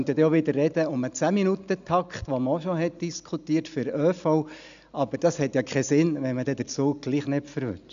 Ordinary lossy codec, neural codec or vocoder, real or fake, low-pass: AAC, 96 kbps; none; real; 7.2 kHz